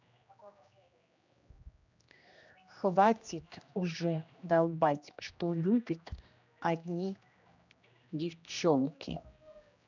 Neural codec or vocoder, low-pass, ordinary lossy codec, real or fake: codec, 16 kHz, 1 kbps, X-Codec, HuBERT features, trained on general audio; 7.2 kHz; none; fake